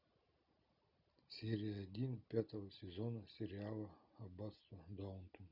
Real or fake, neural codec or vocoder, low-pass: real; none; 5.4 kHz